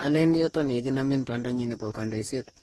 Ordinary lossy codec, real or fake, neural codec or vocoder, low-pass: AAC, 32 kbps; fake; codec, 44.1 kHz, 2.6 kbps, DAC; 19.8 kHz